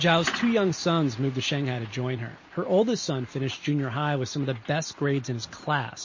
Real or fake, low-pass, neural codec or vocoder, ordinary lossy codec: real; 7.2 kHz; none; MP3, 32 kbps